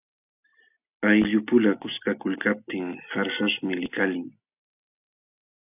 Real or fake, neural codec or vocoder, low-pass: real; none; 3.6 kHz